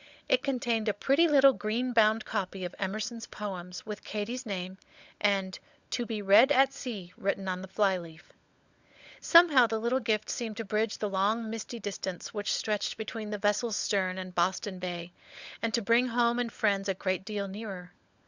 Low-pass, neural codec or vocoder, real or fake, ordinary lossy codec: 7.2 kHz; codec, 16 kHz, 16 kbps, FunCodec, trained on LibriTTS, 50 frames a second; fake; Opus, 64 kbps